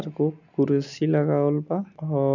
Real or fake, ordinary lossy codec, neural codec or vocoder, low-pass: real; none; none; 7.2 kHz